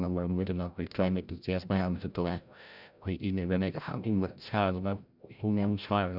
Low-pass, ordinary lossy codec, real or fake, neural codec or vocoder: 5.4 kHz; none; fake; codec, 16 kHz, 0.5 kbps, FreqCodec, larger model